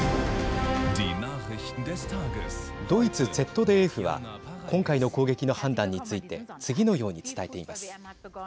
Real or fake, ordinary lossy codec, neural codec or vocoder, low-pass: real; none; none; none